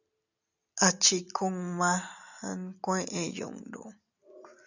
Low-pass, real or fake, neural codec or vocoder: 7.2 kHz; real; none